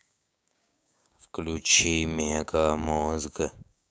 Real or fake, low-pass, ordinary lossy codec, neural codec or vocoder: fake; none; none; codec, 16 kHz, 6 kbps, DAC